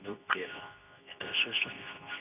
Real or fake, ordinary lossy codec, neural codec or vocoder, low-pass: fake; none; codec, 24 kHz, 0.9 kbps, WavTokenizer, medium speech release version 2; 3.6 kHz